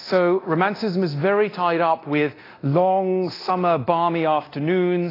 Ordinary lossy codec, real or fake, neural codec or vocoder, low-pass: AAC, 24 kbps; fake; codec, 24 kHz, 0.9 kbps, DualCodec; 5.4 kHz